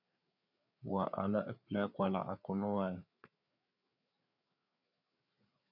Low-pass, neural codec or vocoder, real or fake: 5.4 kHz; codec, 16 kHz, 4 kbps, FreqCodec, larger model; fake